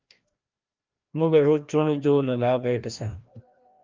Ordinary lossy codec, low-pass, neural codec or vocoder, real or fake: Opus, 32 kbps; 7.2 kHz; codec, 16 kHz, 1 kbps, FreqCodec, larger model; fake